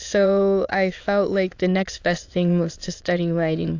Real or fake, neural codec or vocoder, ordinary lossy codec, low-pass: fake; autoencoder, 22.05 kHz, a latent of 192 numbers a frame, VITS, trained on many speakers; AAC, 48 kbps; 7.2 kHz